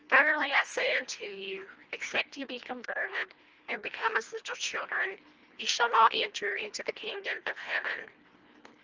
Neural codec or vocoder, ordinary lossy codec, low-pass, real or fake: codec, 24 kHz, 1.5 kbps, HILCodec; Opus, 24 kbps; 7.2 kHz; fake